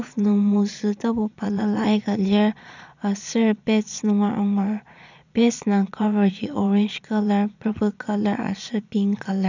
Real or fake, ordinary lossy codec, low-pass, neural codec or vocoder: fake; none; 7.2 kHz; vocoder, 22.05 kHz, 80 mel bands, Vocos